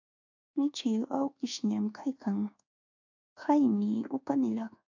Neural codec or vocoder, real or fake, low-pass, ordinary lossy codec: codec, 24 kHz, 1.2 kbps, DualCodec; fake; 7.2 kHz; AAC, 48 kbps